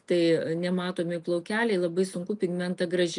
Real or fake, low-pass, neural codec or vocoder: real; 10.8 kHz; none